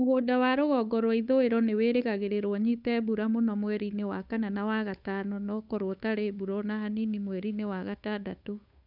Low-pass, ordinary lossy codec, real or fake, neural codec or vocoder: 5.4 kHz; none; fake; codec, 16 kHz, 8 kbps, FunCodec, trained on Chinese and English, 25 frames a second